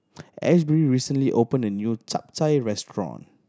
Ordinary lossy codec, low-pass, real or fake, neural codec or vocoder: none; none; real; none